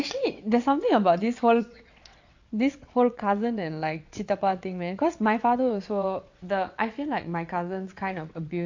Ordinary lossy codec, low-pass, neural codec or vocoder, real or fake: AAC, 48 kbps; 7.2 kHz; vocoder, 22.05 kHz, 80 mel bands, WaveNeXt; fake